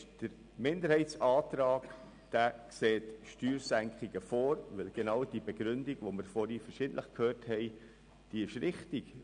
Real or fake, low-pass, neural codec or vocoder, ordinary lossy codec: real; 9.9 kHz; none; none